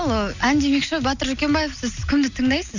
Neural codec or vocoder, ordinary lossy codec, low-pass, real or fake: none; none; 7.2 kHz; real